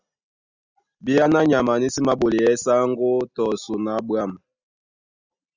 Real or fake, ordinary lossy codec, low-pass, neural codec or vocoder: real; Opus, 64 kbps; 7.2 kHz; none